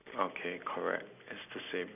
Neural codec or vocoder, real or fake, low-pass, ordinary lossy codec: none; real; 3.6 kHz; none